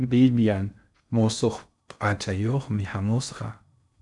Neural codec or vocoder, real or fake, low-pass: codec, 16 kHz in and 24 kHz out, 0.6 kbps, FocalCodec, streaming, 4096 codes; fake; 10.8 kHz